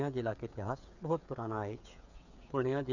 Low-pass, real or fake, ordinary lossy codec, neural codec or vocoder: 7.2 kHz; fake; none; codec, 16 kHz, 8 kbps, FreqCodec, smaller model